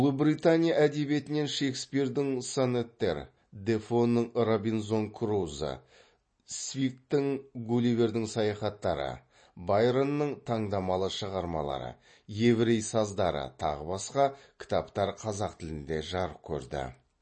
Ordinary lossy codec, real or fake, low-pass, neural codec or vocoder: MP3, 32 kbps; real; 9.9 kHz; none